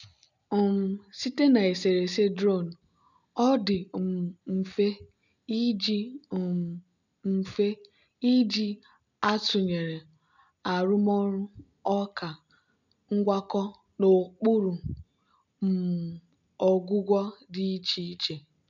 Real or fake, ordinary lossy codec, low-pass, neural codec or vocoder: real; none; 7.2 kHz; none